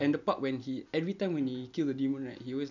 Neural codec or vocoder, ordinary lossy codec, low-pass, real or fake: none; none; 7.2 kHz; real